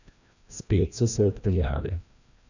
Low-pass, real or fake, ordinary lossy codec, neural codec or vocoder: 7.2 kHz; fake; none; codec, 16 kHz, 1 kbps, FreqCodec, larger model